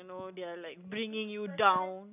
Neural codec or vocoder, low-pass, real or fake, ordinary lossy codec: none; 3.6 kHz; real; none